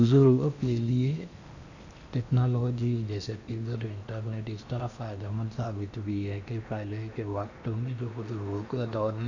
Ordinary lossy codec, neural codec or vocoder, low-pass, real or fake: none; codec, 16 kHz in and 24 kHz out, 0.8 kbps, FocalCodec, streaming, 65536 codes; 7.2 kHz; fake